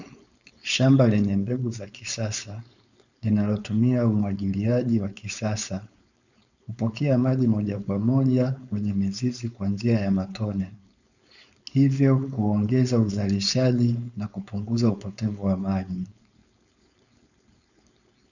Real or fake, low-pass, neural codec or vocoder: fake; 7.2 kHz; codec, 16 kHz, 4.8 kbps, FACodec